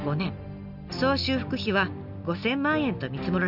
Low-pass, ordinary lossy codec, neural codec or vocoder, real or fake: 5.4 kHz; none; none; real